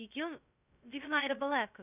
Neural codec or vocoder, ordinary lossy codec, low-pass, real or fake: codec, 16 kHz, 0.2 kbps, FocalCodec; none; 3.6 kHz; fake